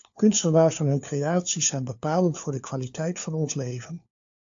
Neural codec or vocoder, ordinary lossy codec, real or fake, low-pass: codec, 16 kHz, 4 kbps, FunCodec, trained on LibriTTS, 50 frames a second; AAC, 64 kbps; fake; 7.2 kHz